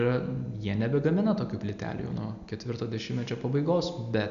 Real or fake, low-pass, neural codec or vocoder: real; 7.2 kHz; none